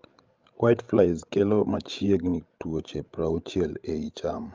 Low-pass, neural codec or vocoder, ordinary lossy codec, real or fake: 7.2 kHz; codec, 16 kHz, 16 kbps, FreqCodec, larger model; Opus, 24 kbps; fake